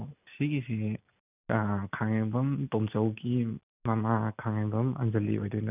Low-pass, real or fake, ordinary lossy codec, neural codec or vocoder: 3.6 kHz; real; none; none